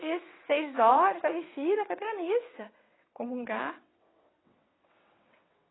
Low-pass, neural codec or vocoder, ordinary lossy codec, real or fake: 7.2 kHz; vocoder, 22.05 kHz, 80 mel bands, WaveNeXt; AAC, 16 kbps; fake